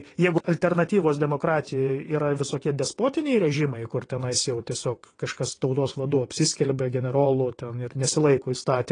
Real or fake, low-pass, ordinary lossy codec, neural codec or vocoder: fake; 9.9 kHz; AAC, 32 kbps; vocoder, 22.05 kHz, 80 mel bands, WaveNeXt